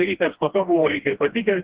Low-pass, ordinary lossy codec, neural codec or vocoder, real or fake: 3.6 kHz; Opus, 16 kbps; codec, 16 kHz, 1 kbps, FreqCodec, smaller model; fake